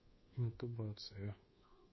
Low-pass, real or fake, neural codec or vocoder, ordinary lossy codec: 7.2 kHz; fake; codec, 24 kHz, 1.2 kbps, DualCodec; MP3, 24 kbps